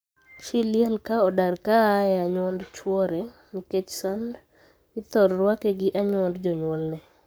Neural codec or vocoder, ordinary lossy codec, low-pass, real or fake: codec, 44.1 kHz, 7.8 kbps, Pupu-Codec; none; none; fake